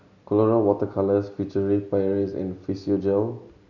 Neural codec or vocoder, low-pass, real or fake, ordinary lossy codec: none; 7.2 kHz; real; none